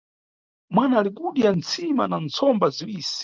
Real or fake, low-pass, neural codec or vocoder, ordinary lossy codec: real; 7.2 kHz; none; Opus, 32 kbps